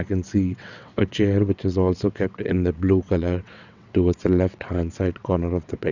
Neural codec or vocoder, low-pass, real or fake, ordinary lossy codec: vocoder, 22.05 kHz, 80 mel bands, WaveNeXt; 7.2 kHz; fake; none